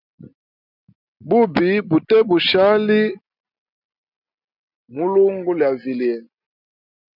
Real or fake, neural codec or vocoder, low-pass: real; none; 5.4 kHz